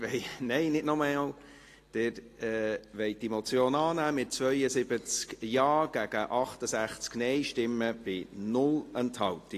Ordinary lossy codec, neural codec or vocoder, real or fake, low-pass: AAC, 48 kbps; none; real; 14.4 kHz